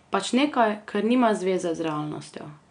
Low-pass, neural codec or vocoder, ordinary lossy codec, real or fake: 9.9 kHz; none; none; real